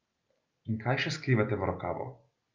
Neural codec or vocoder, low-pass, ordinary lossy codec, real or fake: none; 7.2 kHz; Opus, 24 kbps; real